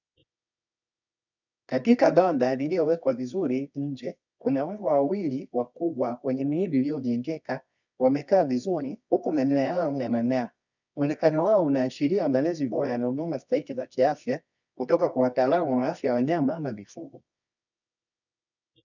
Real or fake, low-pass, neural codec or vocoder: fake; 7.2 kHz; codec, 24 kHz, 0.9 kbps, WavTokenizer, medium music audio release